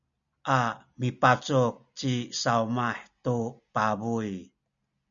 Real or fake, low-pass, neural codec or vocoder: real; 7.2 kHz; none